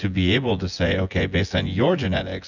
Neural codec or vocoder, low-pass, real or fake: vocoder, 24 kHz, 100 mel bands, Vocos; 7.2 kHz; fake